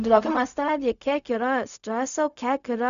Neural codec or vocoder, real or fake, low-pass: codec, 16 kHz, 0.4 kbps, LongCat-Audio-Codec; fake; 7.2 kHz